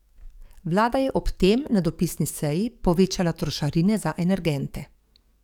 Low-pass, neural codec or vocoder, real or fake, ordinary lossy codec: 19.8 kHz; codec, 44.1 kHz, 7.8 kbps, DAC; fake; none